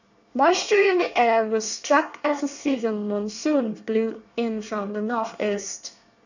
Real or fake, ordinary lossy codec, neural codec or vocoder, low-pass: fake; none; codec, 24 kHz, 1 kbps, SNAC; 7.2 kHz